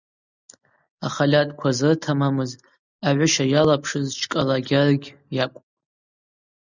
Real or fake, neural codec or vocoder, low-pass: real; none; 7.2 kHz